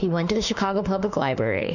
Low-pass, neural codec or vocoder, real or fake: 7.2 kHz; autoencoder, 48 kHz, 32 numbers a frame, DAC-VAE, trained on Japanese speech; fake